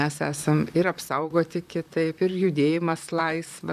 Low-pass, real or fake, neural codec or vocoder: 14.4 kHz; fake; vocoder, 44.1 kHz, 128 mel bands, Pupu-Vocoder